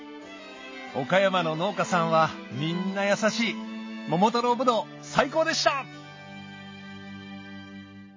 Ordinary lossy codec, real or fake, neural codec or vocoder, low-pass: MP3, 32 kbps; real; none; 7.2 kHz